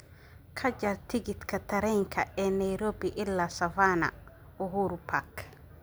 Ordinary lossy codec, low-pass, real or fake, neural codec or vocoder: none; none; real; none